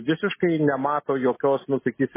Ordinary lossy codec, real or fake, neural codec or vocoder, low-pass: MP3, 16 kbps; real; none; 3.6 kHz